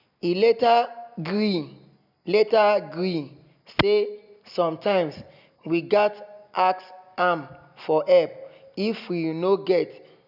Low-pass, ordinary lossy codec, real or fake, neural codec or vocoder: 5.4 kHz; none; real; none